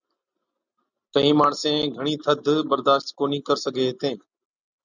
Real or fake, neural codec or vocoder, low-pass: real; none; 7.2 kHz